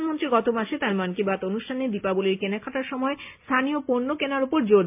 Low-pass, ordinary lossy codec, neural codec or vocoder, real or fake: 3.6 kHz; MP3, 32 kbps; none; real